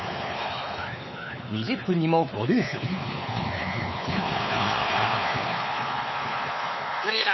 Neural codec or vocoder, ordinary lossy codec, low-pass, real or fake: codec, 16 kHz, 4 kbps, X-Codec, WavLM features, trained on Multilingual LibriSpeech; MP3, 24 kbps; 7.2 kHz; fake